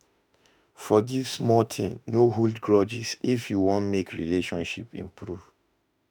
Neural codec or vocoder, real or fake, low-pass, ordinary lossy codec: autoencoder, 48 kHz, 32 numbers a frame, DAC-VAE, trained on Japanese speech; fake; none; none